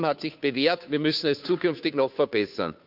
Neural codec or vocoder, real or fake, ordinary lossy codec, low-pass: codec, 16 kHz, 2 kbps, FunCodec, trained on Chinese and English, 25 frames a second; fake; none; 5.4 kHz